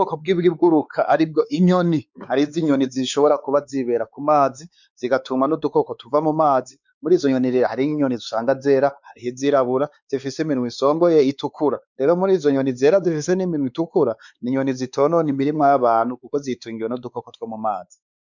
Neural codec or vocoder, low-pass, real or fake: codec, 16 kHz, 4 kbps, X-Codec, WavLM features, trained on Multilingual LibriSpeech; 7.2 kHz; fake